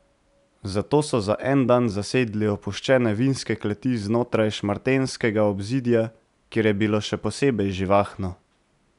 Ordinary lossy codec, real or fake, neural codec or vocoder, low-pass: none; real; none; 10.8 kHz